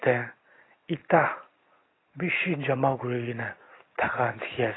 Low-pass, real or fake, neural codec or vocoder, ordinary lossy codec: 7.2 kHz; real; none; AAC, 16 kbps